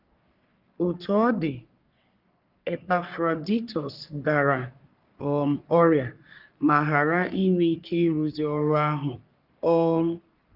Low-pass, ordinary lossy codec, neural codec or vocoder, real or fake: 5.4 kHz; Opus, 24 kbps; codec, 44.1 kHz, 3.4 kbps, Pupu-Codec; fake